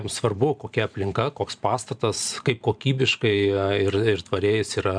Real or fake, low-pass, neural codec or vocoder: fake; 9.9 kHz; vocoder, 44.1 kHz, 128 mel bands every 512 samples, BigVGAN v2